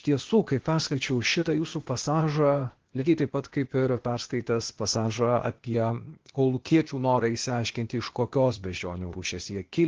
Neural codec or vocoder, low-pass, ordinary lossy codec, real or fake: codec, 16 kHz, 0.8 kbps, ZipCodec; 7.2 kHz; Opus, 16 kbps; fake